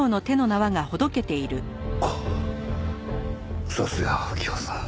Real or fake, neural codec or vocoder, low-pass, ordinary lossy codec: real; none; none; none